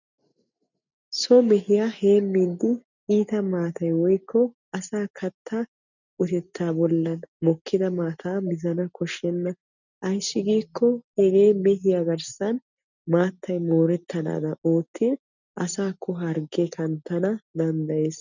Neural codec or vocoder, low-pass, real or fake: none; 7.2 kHz; real